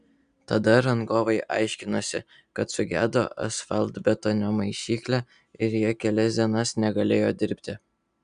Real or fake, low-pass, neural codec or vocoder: real; 9.9 kHz; none